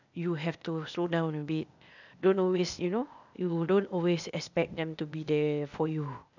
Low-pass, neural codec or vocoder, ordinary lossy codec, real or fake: 7.2 kHz; codec, 16 kHz, 0.8 kbps, ZipCodec; none; fake